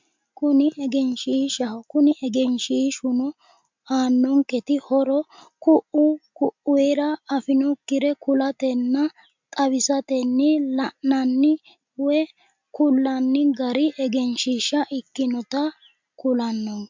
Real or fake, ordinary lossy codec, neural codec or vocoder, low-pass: real; MP3, 64 kbps; none; 7.2 kHz